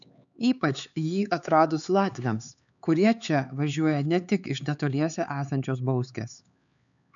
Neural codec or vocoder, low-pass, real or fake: codec, 16 kHz, 4 kbps, X-Codec, HuBERT features, trained on LibriSpeech; 7.2 kHz; fake